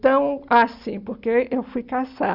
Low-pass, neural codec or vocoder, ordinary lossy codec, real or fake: 5.4 kHz; none; Opus, 64 kbps; real